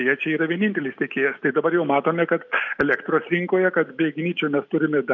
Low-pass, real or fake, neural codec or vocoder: 7.2 kHz; real; none